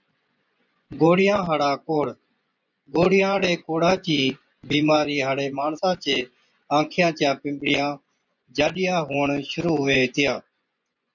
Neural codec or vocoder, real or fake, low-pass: vocoder, 24 kHz, 100 mel bands, Vocos; fake; 7.2 kHz